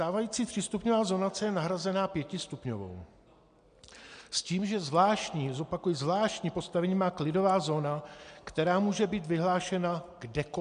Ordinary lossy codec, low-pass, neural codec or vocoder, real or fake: MP3, 64 kbps; 9.9 kHz; none; real